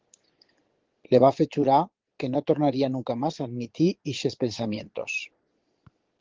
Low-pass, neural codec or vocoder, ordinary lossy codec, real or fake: 7.2 kHz; vocoder, 22.05 kHz, 80 mel bands, Vocos; Opus, 16 kbps; fake